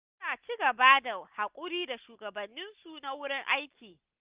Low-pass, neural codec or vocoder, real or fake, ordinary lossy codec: 3.6 kHz; none; real; Opus, 32 kbps